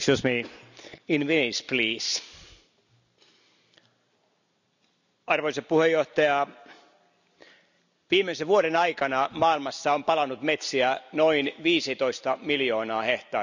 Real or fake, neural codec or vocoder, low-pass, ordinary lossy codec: real; none; 7.2 kHz; none